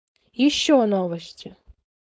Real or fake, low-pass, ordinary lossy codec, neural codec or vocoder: fake; none; none; codec, 16 kHz, 4.8 kbps, FACodec